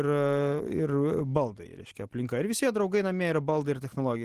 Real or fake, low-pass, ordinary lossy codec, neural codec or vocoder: real; 14.4 kHz; Opus, 16 kbps; none